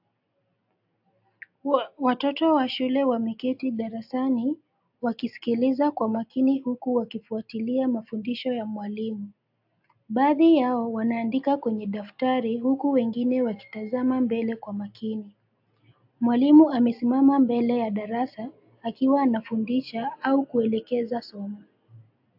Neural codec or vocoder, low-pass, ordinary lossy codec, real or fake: none; 5.4 kHz; AAC, 48 kbps; real